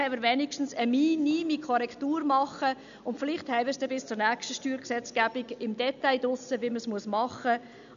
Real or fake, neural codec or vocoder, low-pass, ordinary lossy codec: real; none; 7.2 kHz; none